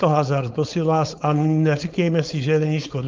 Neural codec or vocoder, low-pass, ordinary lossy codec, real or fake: codec, 16 kHz, 4.8 kbps, FACodec; 7.2 kHz; Opus, 32 kbps; fake